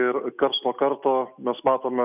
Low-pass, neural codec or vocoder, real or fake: 3.6 kHz; none; real